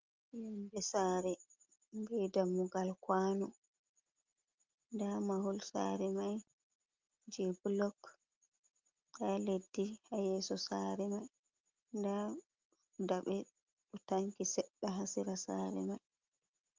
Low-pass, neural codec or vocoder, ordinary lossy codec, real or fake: 7.2 kHz; none; Opus, 32 kbps; real